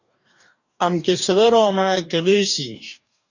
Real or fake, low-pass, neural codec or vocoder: fake; 7.2 kHz; codec, 44.1 kHz, 2.6 kbps, DAC